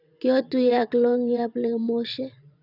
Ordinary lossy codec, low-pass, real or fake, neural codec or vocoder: none; 5.4 kHz; fake; vocoder, 22.05 kHz, 80 mel bands, WaveNeXt